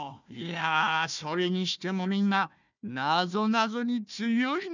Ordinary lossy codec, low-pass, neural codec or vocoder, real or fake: none; 7.2 kHz; codec, 16 kHz, 1 kbps, FunCodec, trained on Chinese and English, 50 frames a second; fake